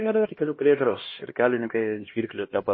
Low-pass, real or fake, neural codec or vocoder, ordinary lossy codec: 7.2 kHz; fake; codec, 16 kHz, 1 kbps, X-Codec, HuBERT features, trained on LibriSpeech; MP3, 24 kbps